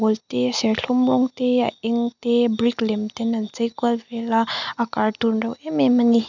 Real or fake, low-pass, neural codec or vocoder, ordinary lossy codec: real; 7.2 kHz; none; none